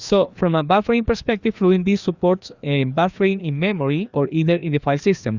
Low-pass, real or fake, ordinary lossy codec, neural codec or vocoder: 7.2 kHz; fake; Opus, 64 kbps; codec, 16 kHz, 2 kbps, FreqCodec, larger model